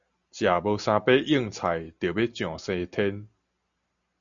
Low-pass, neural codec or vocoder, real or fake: 7.2 kHz; none; real